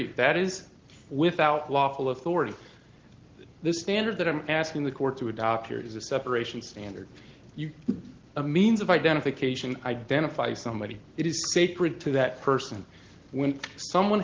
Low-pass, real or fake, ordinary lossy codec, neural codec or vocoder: 7.2 kHz; real; Opus, 16 kbps; none